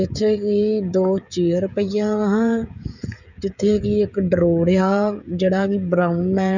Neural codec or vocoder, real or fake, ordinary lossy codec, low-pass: codec, 16 kHz, 16 kbps, FreqCodec, smaller model; fake; none; 7.2 kHz